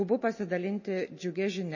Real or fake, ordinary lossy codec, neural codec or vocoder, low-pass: real; MP3, 32 kbps; none; 7.2 kHz